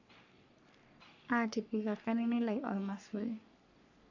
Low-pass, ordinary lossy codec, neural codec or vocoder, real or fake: 7.2 kHz; AAC, 48 kbps; codec, 44.1 kHz, 3.4 kbps, Pupu-Codec; fake